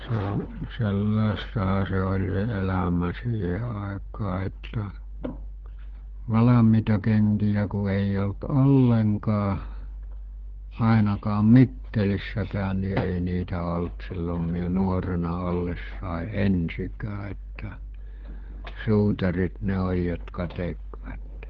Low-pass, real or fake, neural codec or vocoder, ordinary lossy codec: 7.2 kHz; fake; codec, 16 kHz, 4 kbps, FunCodec, trained on LibriTTS, 50 frames a second; Opus, 16 kbps